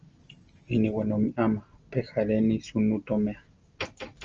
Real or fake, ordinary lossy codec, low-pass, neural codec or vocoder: real; Opus, 24 kbps; 7.2 kHz; none